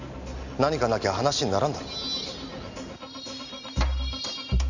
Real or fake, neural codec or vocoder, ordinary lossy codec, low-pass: real; none; none; 7.2 kHz